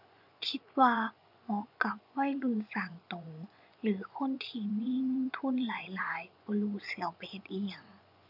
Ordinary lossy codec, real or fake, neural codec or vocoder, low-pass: none; fake; vocoder, 24 kHz, 100 mel bands, Vocos; 5.4 kHz